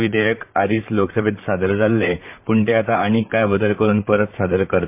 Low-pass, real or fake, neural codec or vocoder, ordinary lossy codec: 3.6 kHz; fake; vocoder, 44.1 kHz, 128 mel bands, Pupu-Vocoder; MP3, 32 kbps